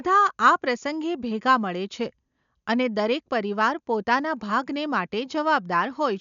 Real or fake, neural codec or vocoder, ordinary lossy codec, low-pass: real; none; none; 7.2 kHz